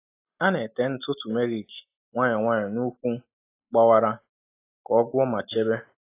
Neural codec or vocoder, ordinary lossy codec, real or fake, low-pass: none; AAC, 24 kbps; real; 3.6 kHz